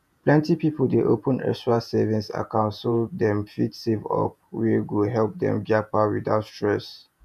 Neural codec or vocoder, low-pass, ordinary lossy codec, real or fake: vocoder, 48 kHz, 128 mel bands, Vocos; 14.4 kHz; none; fake